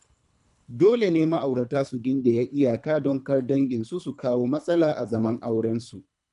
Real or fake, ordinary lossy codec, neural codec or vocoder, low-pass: fake; none; codec, 24 kHz, 3 kbps, HILCodec; 10.8 kHz